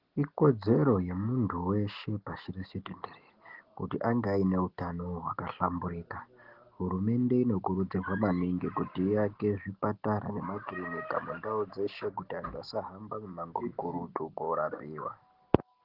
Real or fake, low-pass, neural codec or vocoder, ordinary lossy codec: real; 5.4 kHz; none; Opus, 16 kbps